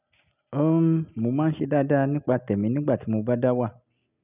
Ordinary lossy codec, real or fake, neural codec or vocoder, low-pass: none; real; none; 3.6 kHz